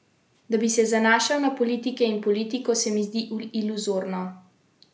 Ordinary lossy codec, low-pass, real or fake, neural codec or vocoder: none; none; real; none